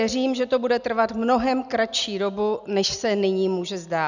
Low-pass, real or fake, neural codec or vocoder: 7.2 kHz; real; none